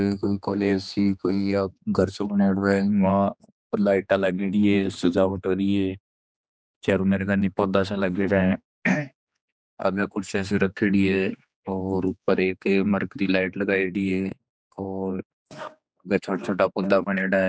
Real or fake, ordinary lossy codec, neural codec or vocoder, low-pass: fake; none; codec, 16 kHz, 2 kbps, X-Codec, HuBERT features, trained on general audio; none